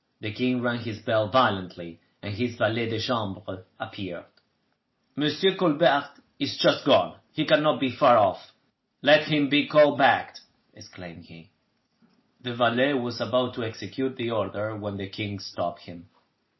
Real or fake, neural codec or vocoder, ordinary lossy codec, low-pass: real; none; MP3, 24 kbps; 7.2 kHz